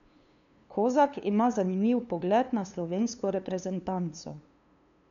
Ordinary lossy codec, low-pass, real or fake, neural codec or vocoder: none; 7.2 kHz; fake; codec, 16 kHz, 2 kbps, FunCodec, trained on LibriTTS, 25 frames a second